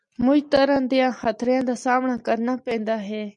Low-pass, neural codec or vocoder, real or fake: 10.8 kHz; none; real